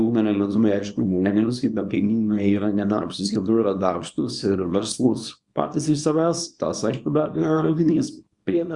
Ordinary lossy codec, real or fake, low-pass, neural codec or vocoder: Opus, 64 kbps; fake; 10.8 kHz; codec, 24 kHz, 0.9 kbps, WavTokenizer, small release